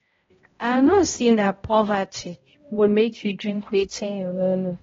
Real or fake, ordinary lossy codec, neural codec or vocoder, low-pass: fake; AAC, 24 kbps; codec, 16 kHz, 0.5 kbps, X-Codec, HuBERT features, trained on balanced general audio; 7.2 kHz